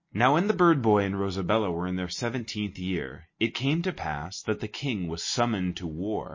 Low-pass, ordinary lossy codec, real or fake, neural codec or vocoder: 7.2 kHz; MP3, 32 kbps; real; none